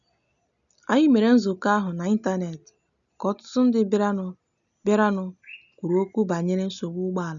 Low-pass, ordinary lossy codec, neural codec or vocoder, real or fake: 7.2 kHz; none; none; real